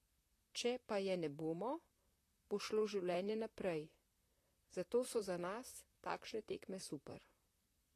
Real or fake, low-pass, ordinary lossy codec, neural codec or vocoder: fake; 14.4 kHz; AAC, 48 kbps; vocoder, 44.1 kHz, 128 mel bands, Pupu-Vocoder